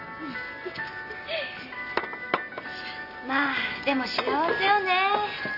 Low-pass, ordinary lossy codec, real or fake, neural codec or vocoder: 5.4 kHz; none; real; none